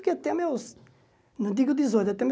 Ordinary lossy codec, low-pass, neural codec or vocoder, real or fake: none; none; none; real